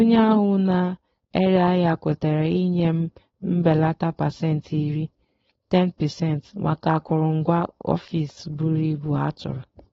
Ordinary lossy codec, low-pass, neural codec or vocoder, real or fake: AAC, 24 kbps; 7.2 kHz; codec, 16 kHz, 4.8 kbps, FACodec; fake